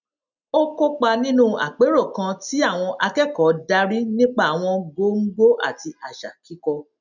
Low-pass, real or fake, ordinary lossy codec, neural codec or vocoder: 7.2 kHz; real; none; none